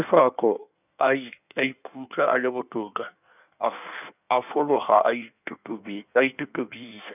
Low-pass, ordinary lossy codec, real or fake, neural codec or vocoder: 3.6 kHz; none; fake; codec, 16 kHz in and 24 kHz out, 1.1 kbps, FireRedTTS-2 codec